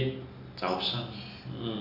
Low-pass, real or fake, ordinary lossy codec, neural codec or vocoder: 5.4 kHz; real; AAC, 48 kbps; none